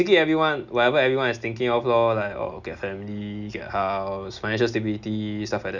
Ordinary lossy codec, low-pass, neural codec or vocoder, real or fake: none; 7.2 kHz; none; real